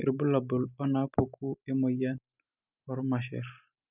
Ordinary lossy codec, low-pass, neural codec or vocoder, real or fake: none; 3.6 kHz; none; real